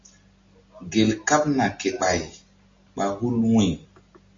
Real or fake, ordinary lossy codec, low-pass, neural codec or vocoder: real; MP3, 48 kbps; 7.2 kHz; none